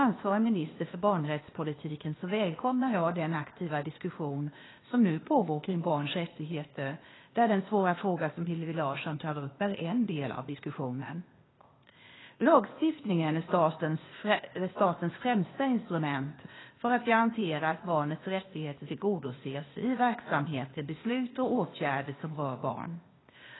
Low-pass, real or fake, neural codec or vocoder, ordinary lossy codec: 7.2 kHz; fake; codec, 16 kHz, 0.8 kbps, ZipCodec; AAC, 16 kbps